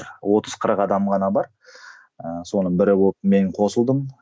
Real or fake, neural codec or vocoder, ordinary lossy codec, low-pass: real; none; none; none